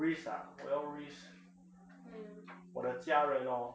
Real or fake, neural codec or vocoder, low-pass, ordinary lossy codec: real; none; none; none